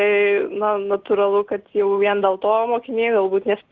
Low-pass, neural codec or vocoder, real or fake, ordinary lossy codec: 7.2 kHz; none; real; Opus, 16 kbps